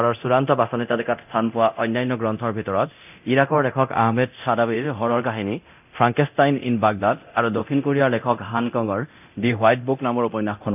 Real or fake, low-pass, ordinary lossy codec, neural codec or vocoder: fake; 3.6 kHz; none; codec, 24 kHz, 0.9 kbps, DualCodec